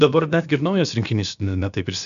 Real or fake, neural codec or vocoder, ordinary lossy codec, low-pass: fake; codec, 16 kHz, about 1 kbps, DyCAST, with the encoder's durations; MP3, 96 kbps; 7.2 kHz